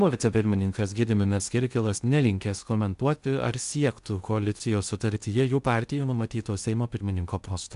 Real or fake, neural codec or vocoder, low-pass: fake; codec, 16 kHz in and 24 kHz out, 0.6 kbps, FocalCodec, streaming, 2048 codes; 10.8 kHz